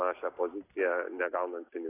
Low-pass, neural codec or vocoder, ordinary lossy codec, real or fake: 3.6 kHz; none; AAC, 16 kbps; real